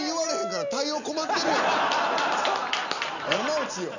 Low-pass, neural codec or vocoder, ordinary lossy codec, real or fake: 7.2 kHz; none; none; real